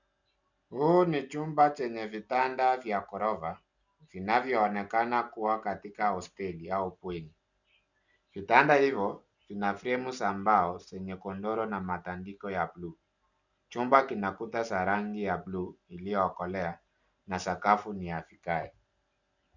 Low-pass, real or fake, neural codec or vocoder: 7.2 kHz; real; none